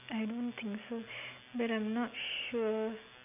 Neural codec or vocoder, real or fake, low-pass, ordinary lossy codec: none; real; 3.6 kHz; none